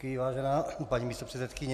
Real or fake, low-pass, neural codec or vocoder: real; 14.4 kHz; none